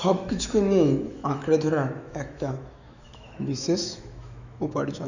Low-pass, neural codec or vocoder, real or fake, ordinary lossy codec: 7.2 kHz; autoencoder, 48 kHz, 128 numbers a frame, DAC-VAE, trained on Japanese speech; fake; none